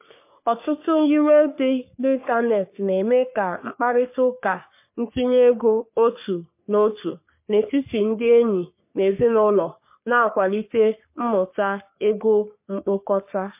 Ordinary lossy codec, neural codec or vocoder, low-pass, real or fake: MP3, 24 kbps; codec, 44.1 kHz, 3.4 kbps, Pupu-Codec; 3.6 kHz; fake